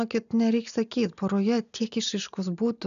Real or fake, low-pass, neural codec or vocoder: real; 7.2 kHz; none